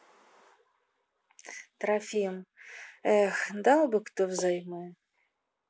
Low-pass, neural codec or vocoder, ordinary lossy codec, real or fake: none; none; none; real